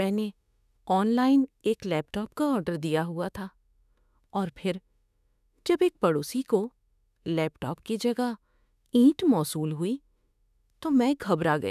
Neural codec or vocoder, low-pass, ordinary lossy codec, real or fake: autoencoder, 48 kHz, 32 numbers a frame, DAC-VAE, trained on Japanese speech; 14.4 kHz; none; fake